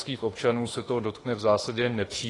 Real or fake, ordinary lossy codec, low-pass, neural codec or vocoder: fake; AAC, 32 kbps; 10.8 kHz; autoencoder, 48 kHz, 32 numbers a frame, DAC-VAE, trained on Japanese speech